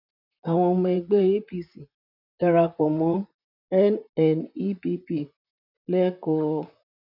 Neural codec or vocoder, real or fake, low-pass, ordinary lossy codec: vocoder, 44.1 kHz, 128 mel bands, Pupu-Vocoder; fake; 5.4 kHz; none